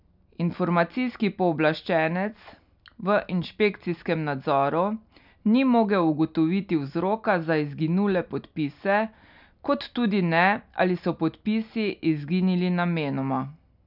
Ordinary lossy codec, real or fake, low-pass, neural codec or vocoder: none; real; 5.4 kHz; none